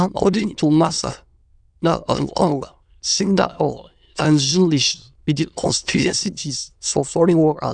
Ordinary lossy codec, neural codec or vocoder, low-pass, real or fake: none; autoencoder, 22.05 kHz, a latent of 192 numbers a frame, VITS, trained on many speakers; 9.9 kHz; fake